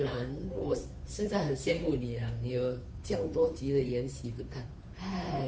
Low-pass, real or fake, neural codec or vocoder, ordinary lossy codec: none; fake; codec, 16 kHz, 2 kbps, FunCodec, trained on Chinese and English, 25 frames a second; none